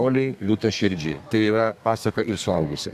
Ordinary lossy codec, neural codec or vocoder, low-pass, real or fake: AAC, 96 kbps; codec, 32 kHz, 1.9 kbps, SNAC; 14.4 kHz; fake